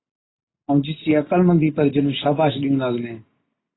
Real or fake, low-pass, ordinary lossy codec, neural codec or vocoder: real; 7.2 kHz; AAC, 16 kbps; none